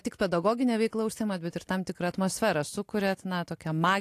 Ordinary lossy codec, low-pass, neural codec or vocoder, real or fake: AAC, 64 kbps; 14.4 kHz; none; real